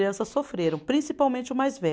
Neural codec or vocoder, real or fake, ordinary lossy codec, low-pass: none; real; none; none